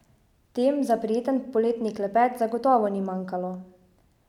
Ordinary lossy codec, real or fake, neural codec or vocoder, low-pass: none; real; none; 19.8 kHz